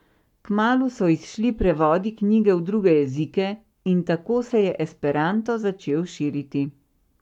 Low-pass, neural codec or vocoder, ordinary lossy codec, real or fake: 19.8 kHz; codec, 44.1 kHz, 7.8 kbps, Pupu-Codec; none; fake